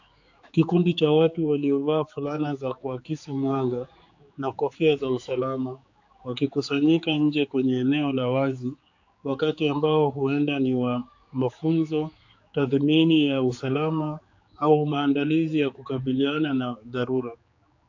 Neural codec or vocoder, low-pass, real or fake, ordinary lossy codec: codec, 16 kHz, 4 kbps, X-Codec, HuBERT features, trained on general audio; 7.2 kHz; fake; AAC, 48 kbps